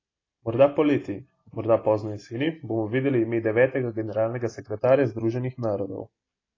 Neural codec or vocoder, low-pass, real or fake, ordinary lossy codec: none; 7.2 kHz; real; AAC, 32 kbps